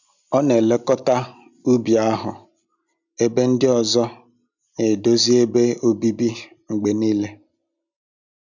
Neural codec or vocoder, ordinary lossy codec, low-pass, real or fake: none; none; 7.2 kHz; real